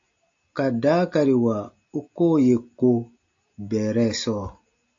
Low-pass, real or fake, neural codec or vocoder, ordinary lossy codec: 7.2 kHz; real; none; AAC, 48 kbps